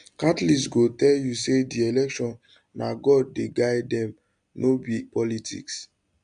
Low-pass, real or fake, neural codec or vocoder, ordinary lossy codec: 9.9 kHz; real; none; none